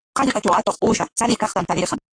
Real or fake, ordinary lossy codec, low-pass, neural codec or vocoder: fake; AAC, 48 kbps; 9.9 kHz; codec, 44.1 kHz, 7.8 kbps, Pupu-Codec